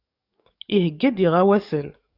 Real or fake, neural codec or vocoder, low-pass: fake; codec, 44.1 kHz, 7.8 kbps, DAC; 5.4 kHz